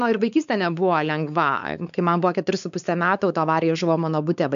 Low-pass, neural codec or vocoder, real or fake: 7.2 kHz; codec, 16 kHz, 4 kbps, X-Codec, WavLM features, trained on Multilingual LibriSpeech; fake